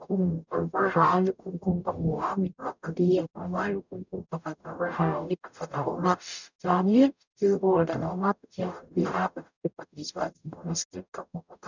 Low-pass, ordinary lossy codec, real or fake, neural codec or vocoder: 7.2 kHz; none; fake; codec, 44.1 kHz, 0.9 kbps, DAC